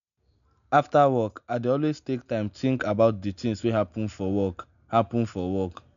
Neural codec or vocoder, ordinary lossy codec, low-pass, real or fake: none; none; 7.2 kHz; real